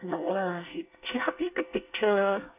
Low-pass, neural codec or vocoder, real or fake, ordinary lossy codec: 3.6 kHz; codec, 24 kHz, 1 kbps, SNAC; fake; none